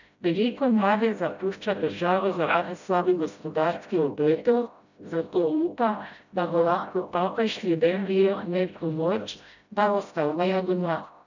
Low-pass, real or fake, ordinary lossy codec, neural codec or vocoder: 7.2 kHz; fake; none; codec, 16 kHz, 0.5 kbps, FreqCodec, smaller model